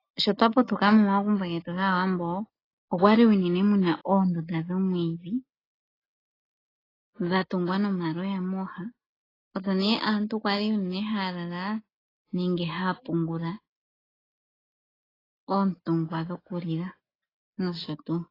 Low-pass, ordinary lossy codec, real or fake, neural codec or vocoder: 5.4 kHz; AAC, 24 kbps; real; none